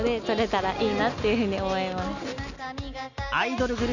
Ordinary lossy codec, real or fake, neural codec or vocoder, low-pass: none; real; none; 7.2 kHz